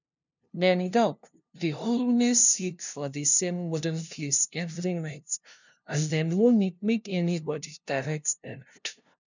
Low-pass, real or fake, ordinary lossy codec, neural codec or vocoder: 7.2 kHz; fake; none; codec, 16 kHz, 0.5 kbps, FunCodec, trained on LibriTTS, 25 frames a second